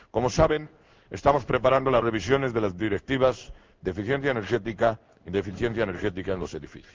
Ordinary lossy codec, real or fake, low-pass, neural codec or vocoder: Opus, 24 kbps; fake; 7.2 kHz; codec, 16 kHz in and 24 kHz out, 1 kbps, XY-Tokenizer